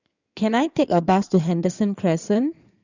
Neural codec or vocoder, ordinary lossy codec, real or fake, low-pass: codec, 16 kHz in and 24 kHz out, 2.2 kbps, FireRedTTS-2 codec; none; fake; 7.2 kHz